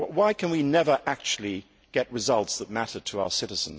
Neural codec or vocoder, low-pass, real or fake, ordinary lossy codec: none; none; real; none